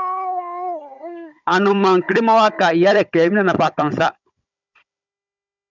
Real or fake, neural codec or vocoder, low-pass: fake; codec, 16 kHz, 16 kbps, FunCodec, trained on Chinese and English, 50 frames a second; 7.2 kHz